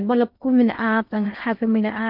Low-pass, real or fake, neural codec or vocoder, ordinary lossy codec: 5.4 kHz; fake; codec, 16 kHz in and 24 kHz out, 0.6 kbps, FocalCodec, streaming, 4096 codes; none